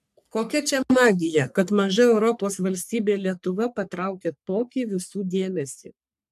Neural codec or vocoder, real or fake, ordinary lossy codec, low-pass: codec, 44.1 kHz, 3.4 kbps, Pupu-Codec; fake; AAC, 96 kbps; 14.4 kHz